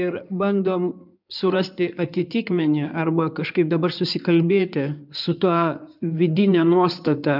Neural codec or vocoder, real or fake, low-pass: codec, 16 kHz in and 24 kHz out, 2.2 kbps, FireRedTTS-2 codec; fake; 5.4 kHz